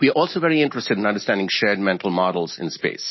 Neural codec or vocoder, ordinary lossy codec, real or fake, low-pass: none; MP3, 24 kbps; real; 7.2 kHz